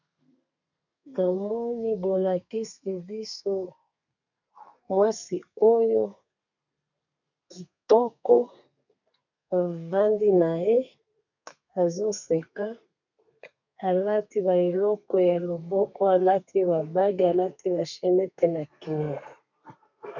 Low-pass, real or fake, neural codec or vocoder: 7.2 kHz; fake; codec, 32 kHz, 1.9 kbps, SNAC